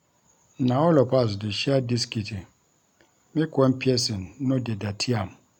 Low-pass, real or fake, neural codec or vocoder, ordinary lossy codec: 19.8 kHz; real; none; none